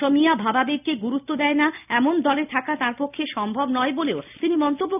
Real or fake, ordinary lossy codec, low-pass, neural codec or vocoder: real; none; 3.6 kHz; none